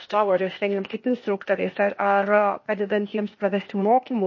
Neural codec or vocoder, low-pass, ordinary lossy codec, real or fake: codec, 16 kHz, 0.8 kbps, ZipCodec; 7.2 kHz; MP3, 32 kbps; fake